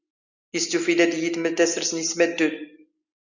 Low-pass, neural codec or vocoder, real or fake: 7.2 kHz; none; real